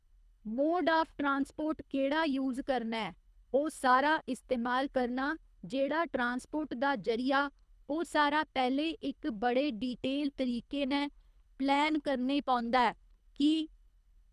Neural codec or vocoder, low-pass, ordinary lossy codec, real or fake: codec, 24 kHz, 3 kbps, HILCodec; none; none; fake